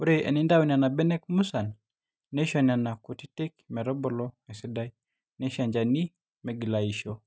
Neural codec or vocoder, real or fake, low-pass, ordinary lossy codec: none; real; none; none